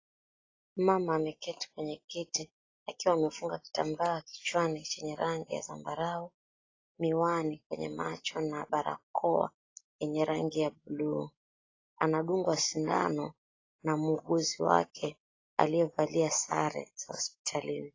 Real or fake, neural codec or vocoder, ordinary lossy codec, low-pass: real; none; AAC, 32 kbps; 7.2 kHz